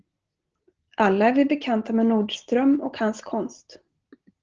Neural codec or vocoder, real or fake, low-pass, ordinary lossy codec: none; real; 7.2 kHz; Opus, 16 kbps